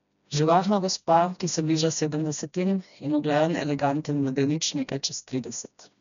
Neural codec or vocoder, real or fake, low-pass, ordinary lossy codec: codec, 16 kHz, 1 kbps, FreqCodec, smaller model; fake; 7.2 kHz; none